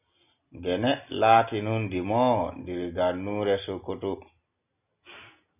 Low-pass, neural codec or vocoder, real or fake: 3.6 kHz; none; real